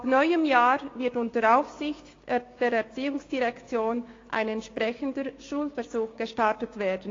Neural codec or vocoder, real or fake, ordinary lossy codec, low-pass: codec, 16 kHz, 6 kbps, DAC; fake; AAC, 32 kbps; 7.2 kHz